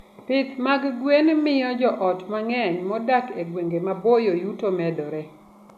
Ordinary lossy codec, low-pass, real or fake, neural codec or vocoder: none; 14.4 kHz; real; none